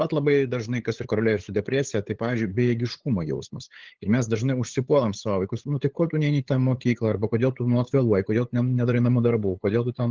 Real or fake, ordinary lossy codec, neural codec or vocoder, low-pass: fake; Opus, 16 kbps; codec, 16 kHz, 8 kbps, FunCodec, trained on LibriTTS, 25 frames a second; 7.2 kHz